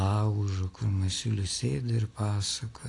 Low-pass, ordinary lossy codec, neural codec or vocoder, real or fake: 10.8 kHz; AAC, 48 kbps; none; real